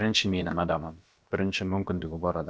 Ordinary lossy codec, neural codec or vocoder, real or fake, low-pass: none; codec, 16 kHz, about 1 kbps, DyCAST, with the encoder's durations; fake; none